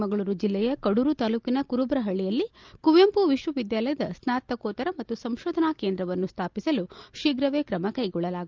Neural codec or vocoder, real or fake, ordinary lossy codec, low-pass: none; real; Opus, 24 kbps; 7.2 kHz